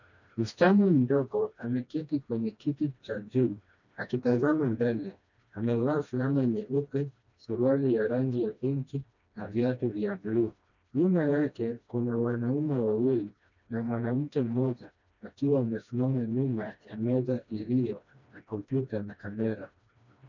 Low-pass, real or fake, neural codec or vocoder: 7.2 kHz; fake; codec, 16 kHz, 1 kbps, FreqCodec, smaller model